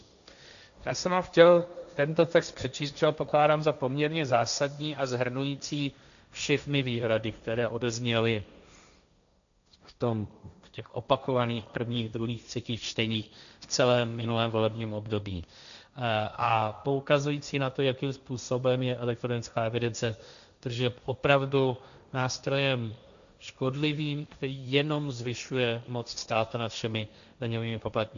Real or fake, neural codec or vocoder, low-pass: fake; codec, 16 kHz, 1.1 kbps, Voila-Tokenizer; 7.2 kHz